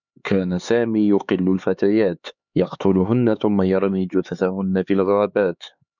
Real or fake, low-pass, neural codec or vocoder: fake; 7.2 kHz; codec, 16 kHz, 4 kbps, X-Codec, HuBERT features, trained on LibriSpeech